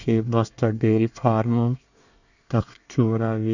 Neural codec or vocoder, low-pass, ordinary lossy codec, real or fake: codec, 24 kHz, 1 kbps, SNAC; 7.2 kHz; none; fake